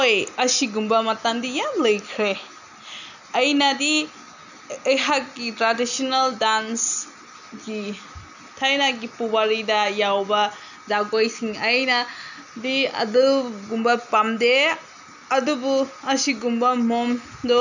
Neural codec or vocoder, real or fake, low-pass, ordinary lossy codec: none; real; 7.2 kHz; none